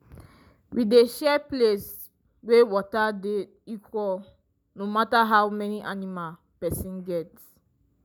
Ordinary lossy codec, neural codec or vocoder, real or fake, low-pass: none; none; real; none